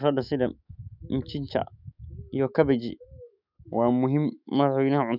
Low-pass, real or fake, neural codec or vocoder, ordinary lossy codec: 5.4 kHz; fake; autoencoder, 48 kHz, 128 numbers a frame, DAC-VAE, trained on Japanese speech; none